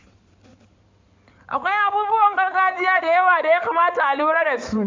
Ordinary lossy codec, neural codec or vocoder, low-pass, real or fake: MP3, 64 kbps; codec, 16 kHz in and 24 kHz out, 2.2 kbps, FireRedTTS-2 codec; 7.2 kHz; fake